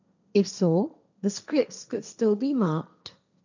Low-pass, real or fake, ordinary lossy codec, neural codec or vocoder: 7.2 kHz; fake; none; codec, 16 kHz, 1.1 kbps, Voila-Tokenizer